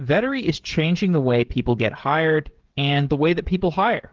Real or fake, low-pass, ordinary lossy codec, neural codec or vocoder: fake; 7.2 kHz; Opus, 16 kbps; codec, 16 kHz, 16 kbps, FreqCodec, smaller model